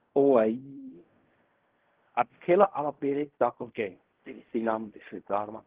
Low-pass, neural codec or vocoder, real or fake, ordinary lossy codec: 3.6 kHz; codec, 16 kHz in and 24 kHz out, 0.4 kbps, LongCat-Audio-Codec, fine tuned four codebook decoder; fake; Opus, 16 kbps